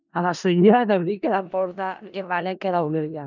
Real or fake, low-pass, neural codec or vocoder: fake; 7.2 kHz; codec, 16 kHz in and 24 kHz out, 0.4 kbps, LongCat-Audio-Codec, four codebook decoder